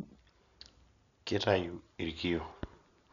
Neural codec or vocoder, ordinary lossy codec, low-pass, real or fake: none; none; 7.2 kHz; real